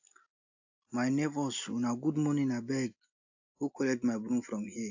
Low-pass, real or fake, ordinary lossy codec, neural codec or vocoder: 7.2 kHz; real; AAC, 48 kbps; none